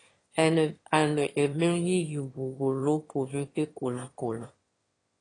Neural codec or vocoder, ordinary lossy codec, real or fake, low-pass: autoencoder, 22.05 kHz, a latent of 192 numbers a frame, VITS, trained on one speaker; AAC, 32 kbps; fake; 9.9 kHz